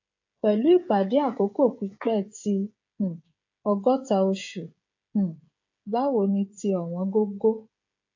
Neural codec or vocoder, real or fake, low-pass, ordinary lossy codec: codec, 16 kHz, 16 kbps, FreqCodec, smaller model; fake; 7.2 kHz; AAC, 48 kbps